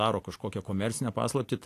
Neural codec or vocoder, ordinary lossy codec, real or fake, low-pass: none; AAC, 64 kbps; real; 14.4 kHz